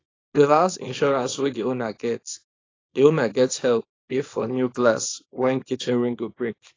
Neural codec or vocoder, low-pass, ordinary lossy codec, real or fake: codec, 24 kHz, 0.9 kbps, WavTokenizer, small release; 7.2 kHz; AAC, 32 kbps; fake